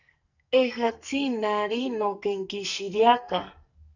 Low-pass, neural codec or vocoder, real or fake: 7.2 kHz; codec, 44.1 kHz, 2.6 kbps, SNAC; fake